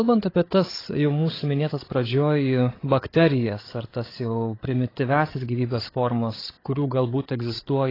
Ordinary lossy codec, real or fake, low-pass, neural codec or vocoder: AAC, 24 kbps; fake; 5.4 kHz; codec, 16 kHz, 8 kbps, FreqCodec, larger model